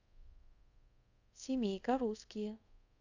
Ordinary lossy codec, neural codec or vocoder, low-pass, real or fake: none; codec, 24 kHz, 0.5 kbps, DualCodec; 7.2 kHz; fake